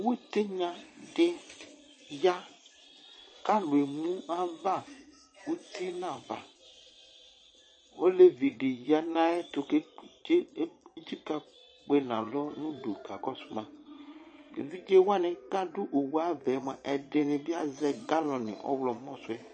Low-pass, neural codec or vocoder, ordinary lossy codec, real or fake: 9.9 kHz; vocoder, 22.05 kHz, 80 mel bands, Vocos; MP3, 32 kbps; fake